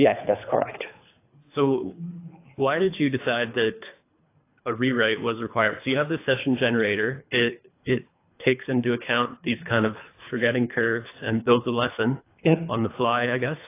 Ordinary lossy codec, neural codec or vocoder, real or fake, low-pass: AAC, 24 kbps; codec, 24 kHz, 3 kbps, HILCodec; fake; 3.6 kHz